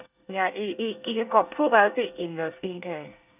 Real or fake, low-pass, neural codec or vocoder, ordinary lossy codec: fake; 3.6 kHz; codec, 24 kHz, 1 kbps, SNAC; none